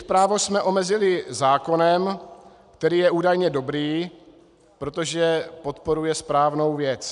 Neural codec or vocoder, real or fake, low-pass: none; real; 10.8 kHz